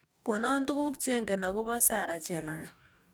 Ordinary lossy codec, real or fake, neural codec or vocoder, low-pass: none; fake; codec, 44.1 kHz, 2.6 kbps, DAC; none